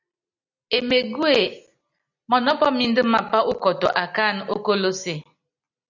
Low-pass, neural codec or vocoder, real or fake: 7.2 kHz; none; real